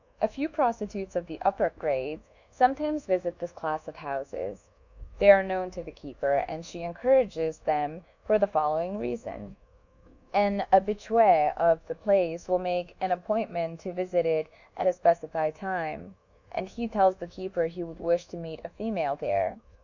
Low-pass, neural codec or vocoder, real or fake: 7.2 kHz; codec, 24 kHz, 1.2 kbps, DualCodec; fake